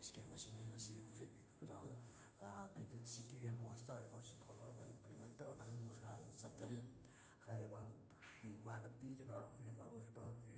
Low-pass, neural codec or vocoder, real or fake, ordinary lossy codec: none; codec, 16 kHz, 0.5 kbps, FunCodec, trained on Chinese and English, 25 frames a second; fake; none